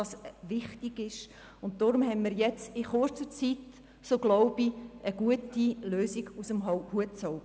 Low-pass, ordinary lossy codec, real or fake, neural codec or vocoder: none; none; real; none